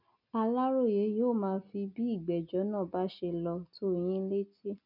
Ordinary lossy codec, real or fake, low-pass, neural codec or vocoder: none; real; 5.4 kHz; none